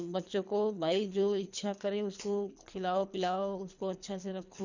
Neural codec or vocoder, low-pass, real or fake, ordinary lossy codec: codec, 24 kHz, 3 kbps, HILCodec; 7.2 kHz; fake; none